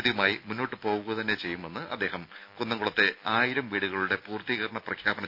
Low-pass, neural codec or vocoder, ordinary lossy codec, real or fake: 5.4 kHz; none; none; real